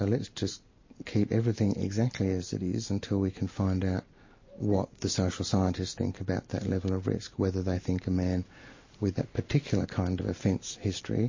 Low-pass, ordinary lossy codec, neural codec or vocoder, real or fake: 7.2 kHz; MP3, 32 kbps; none; real